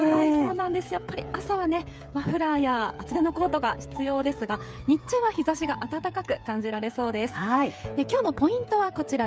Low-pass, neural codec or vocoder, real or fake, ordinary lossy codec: none; codec, 16 kHz, 8 kbps, FreqCodec, smaller model; fake; none